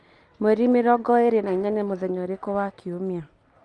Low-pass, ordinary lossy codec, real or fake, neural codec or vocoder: 10.8 kHz; Opus, 32 kbps; real; none